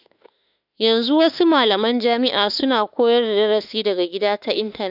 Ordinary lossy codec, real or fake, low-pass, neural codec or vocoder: none; fake; 5.4 kHz; autoencoder, 48 kHz, 32 numbers a frame, DAC-VAE, trained on Japanese speech